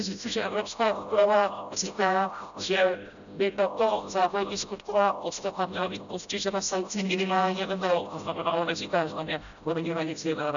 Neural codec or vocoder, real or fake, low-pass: codec, 16 kHz, 0.5 kbps, FreqCodec, smaller model; fake; 7.2 kHz